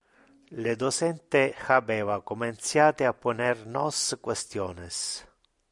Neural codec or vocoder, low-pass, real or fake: none; 10.8 kHz; real